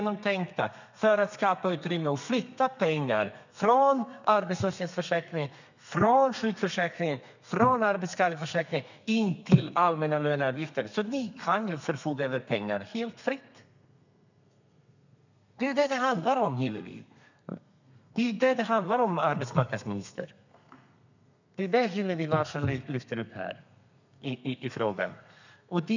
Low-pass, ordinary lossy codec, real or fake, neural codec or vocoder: 7.2 kHz; none; fake; codec, 32 kHz, 1.9 kbps, SNAC